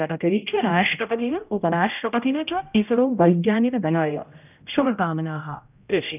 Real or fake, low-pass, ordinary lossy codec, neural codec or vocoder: fake; 3.6 kHz; none; codec, 16 kHz, 0.5 kbps, X-Codec, HuBERT features, trained on general audio